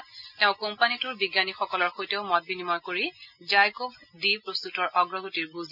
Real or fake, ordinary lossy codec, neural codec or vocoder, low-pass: real; none; none; 5.4 kHz